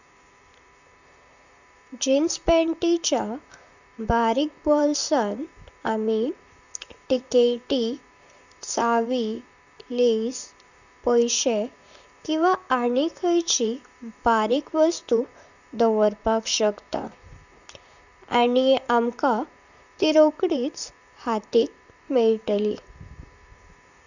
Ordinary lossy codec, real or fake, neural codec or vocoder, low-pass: none; fake; vocoder, 44.1 kHz, 128 mel bands, Pupu-Vocoder; 7.2 kHz